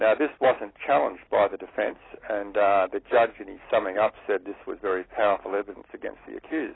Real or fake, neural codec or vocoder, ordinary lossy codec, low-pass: real; none; AAC, 16 kbps; 7.2 kHz